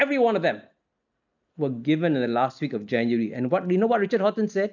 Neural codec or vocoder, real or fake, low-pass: none; real; 7.2 kHz